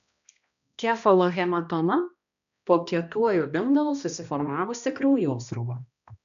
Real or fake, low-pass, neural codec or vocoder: fake; 7.2 kHz; codec, 16 kHz, 1 kbps, X-Codec, HuBERT features, trained on balanced general audio